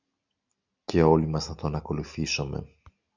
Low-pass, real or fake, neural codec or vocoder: 7.2 kHz; real; none